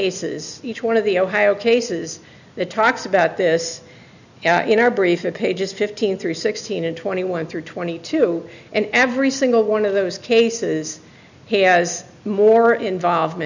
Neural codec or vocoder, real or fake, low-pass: none; real; 7.2 kHz